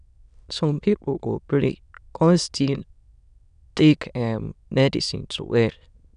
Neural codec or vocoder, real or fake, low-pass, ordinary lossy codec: autoencoder, 22.05 kHz, a latent of 192 numbers a frame, VITS, trained on many speakers; fake; 9.9 kHz; none